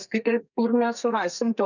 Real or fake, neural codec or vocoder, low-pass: fake; codec, 32 kHz, 1.9 kbps, SNAC; 7.2 kHz